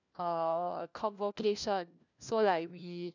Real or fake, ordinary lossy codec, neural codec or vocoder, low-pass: fake; none; codec, 16 kHz, 1 kbps, FunCodec, trained on LibriTTS, 50 frames a second; 7.2 kHz